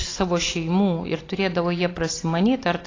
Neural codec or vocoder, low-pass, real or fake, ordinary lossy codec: none; 7.2 kHz; real; AAC, 32 kbps